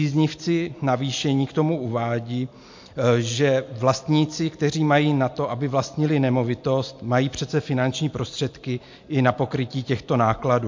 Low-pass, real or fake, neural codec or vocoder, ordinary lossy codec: 7.2 kHz; real; none; MP3, 48 kbps